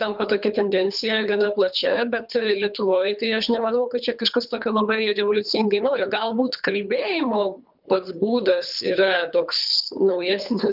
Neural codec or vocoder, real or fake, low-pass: codec, 24 kHz, 3 kbps, HILCodec; fake; 5.4 kHz